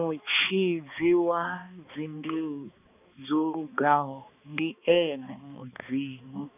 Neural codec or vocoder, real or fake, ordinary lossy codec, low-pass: codec, 16 kHz, 2 kbps, X-Codec, HuBERT features, trained on balanced general audio; fake; none; 3.6 kHz